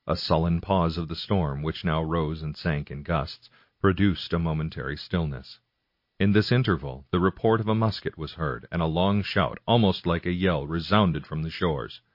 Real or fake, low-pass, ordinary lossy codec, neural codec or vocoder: real; 5.4 kHz; MP3, 32 kbps; none